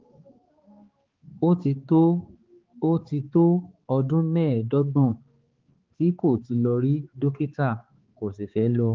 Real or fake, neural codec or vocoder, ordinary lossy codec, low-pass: fake; codec, 16 kHz, 4 kbps, X-Codec, HuBERT features, trained on balanced general audio; Opus, 16 kbps; 7.2 kHz